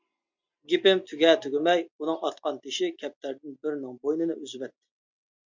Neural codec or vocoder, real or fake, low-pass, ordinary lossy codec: none; real; 7.2 kHz; MP3, 64 kbps